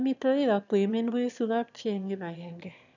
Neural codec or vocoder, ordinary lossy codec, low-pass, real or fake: autoencoder, 22.05 kHz, a latent of 192 numbers a frame, VITS, trained on one speaker; none; 7.2 kHz; fake